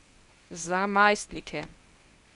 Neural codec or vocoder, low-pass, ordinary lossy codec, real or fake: codec, 24 kHz, 0.9 kbps, WavTokenizer, medium speech release version 1; 10.8 kHz; none; fake